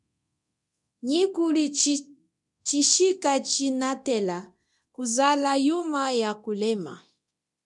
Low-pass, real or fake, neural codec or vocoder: 10.8 kHz; fake; codec, 24 kHz, 0.9 kbps, DualCodec